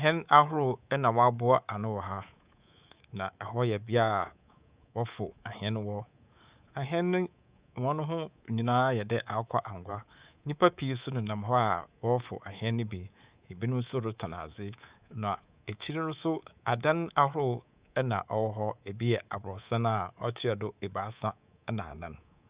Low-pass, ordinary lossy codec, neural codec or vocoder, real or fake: 3.6 kHz; Opus, 64 kbps; codec, 24 kHz, 3.1 kbps, DualCodec; fake